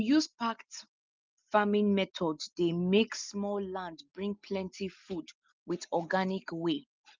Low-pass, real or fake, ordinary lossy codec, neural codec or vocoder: 7.2 kHz; real; Opus, 24 kbps; none